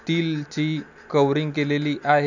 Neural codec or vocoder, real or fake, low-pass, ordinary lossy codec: none; real; 7.2 kHz; none